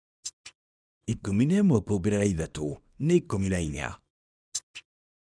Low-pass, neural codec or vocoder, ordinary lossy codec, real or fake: 9.9 kHz; codec, 24 kHz, 0.9 kbps, WavTokenizer, small release; none; fake